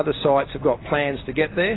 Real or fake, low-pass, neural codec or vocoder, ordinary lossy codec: real; 7.2 kHz; none; AAC, 16 kbps